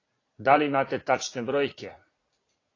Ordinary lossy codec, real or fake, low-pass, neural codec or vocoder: AAC, 32 kbps; fake; 7.2 kHz; vocoder, 44.1 kHz, 80 mel bands, Vocos